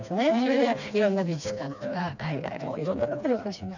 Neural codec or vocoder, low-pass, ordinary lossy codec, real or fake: codec, 16 kHz, 2 kbps, FreqCodec, smaller model; 7.2 kHz; none; fake